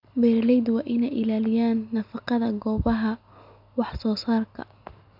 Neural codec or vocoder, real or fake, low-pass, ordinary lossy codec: none; real; 5.4 kHz; none